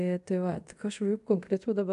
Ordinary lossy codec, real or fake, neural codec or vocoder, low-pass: AAC, 96 kbps; fake; codec, 24 kHz, 0.5 kbps, DualCodec; 10.8 kHz